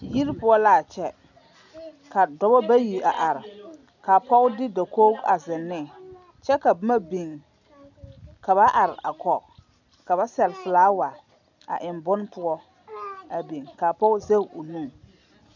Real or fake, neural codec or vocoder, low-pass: real; none; 7.2 kHz